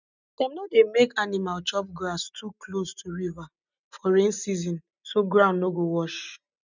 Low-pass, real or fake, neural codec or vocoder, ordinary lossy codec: 7.2 kHz; real; none; none